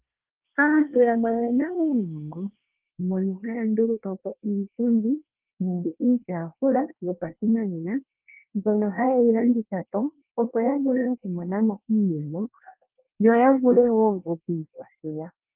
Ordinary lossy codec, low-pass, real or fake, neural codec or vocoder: Opus, 32 kbps; 3.6 kHz; fake; codec, 24 kHz, 1 kbps, SNAC